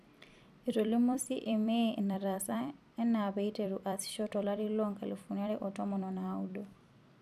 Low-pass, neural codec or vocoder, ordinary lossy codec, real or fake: 14.4 kHz; vocoder, 44.1 kHz, 128 mel bands every 256 samples, BigVGAN v2; none; fake